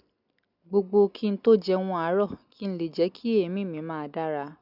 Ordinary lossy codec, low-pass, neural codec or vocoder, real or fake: none; 5.4 kHz; none; real